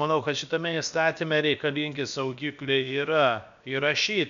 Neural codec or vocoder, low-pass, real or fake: codec, 16 kHz, about 1 kbps, DyCAST, with the encoder's durations; 7.2 kHz; fake